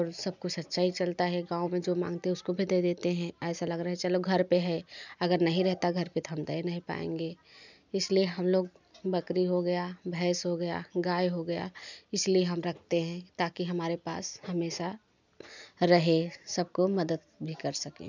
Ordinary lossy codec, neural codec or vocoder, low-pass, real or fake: none; none; 7.2 kHz; real